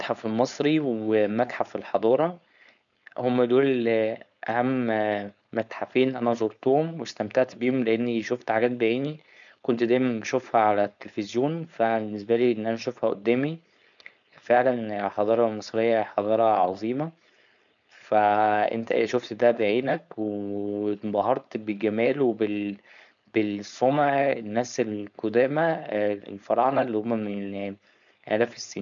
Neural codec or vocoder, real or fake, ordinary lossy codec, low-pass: codec, 16 kHz, 4.8 kbps, FACodec; fake; none; 7.2 kHz